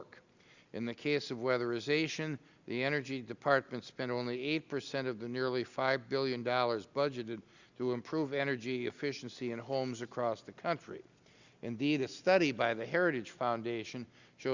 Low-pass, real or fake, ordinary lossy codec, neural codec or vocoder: 7.2 kHz; real; Opus, 64 kbps; none